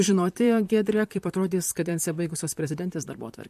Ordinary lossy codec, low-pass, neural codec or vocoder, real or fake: MP3, 64 kbps; 14.4 kHz; vocoder, 44.1 kHz, 128 mel bands, Pupu-Vocoder; fake